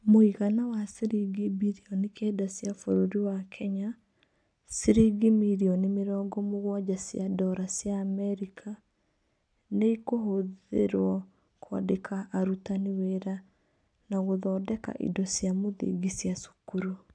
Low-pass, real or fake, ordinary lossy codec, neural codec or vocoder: 9.9 kHz; real; none; none